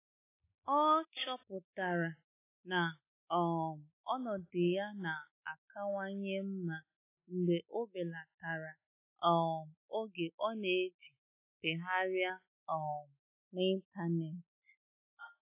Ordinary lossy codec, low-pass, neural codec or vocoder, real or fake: AAC, 24 kbps; 3.6 kHz; none; real